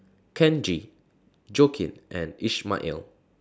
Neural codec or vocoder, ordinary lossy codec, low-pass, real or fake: none; none; none; real